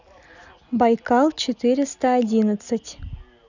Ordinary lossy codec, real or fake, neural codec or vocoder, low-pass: none; real; none; 7.2 kHz